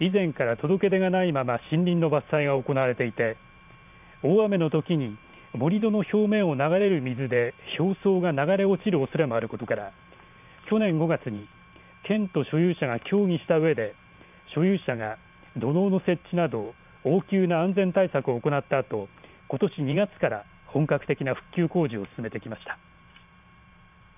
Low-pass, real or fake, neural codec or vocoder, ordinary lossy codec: 3.6 kHz; fake; vocoder, 44.1 kHz, 128 mel bands every 512 samples, BigVGAN v2; none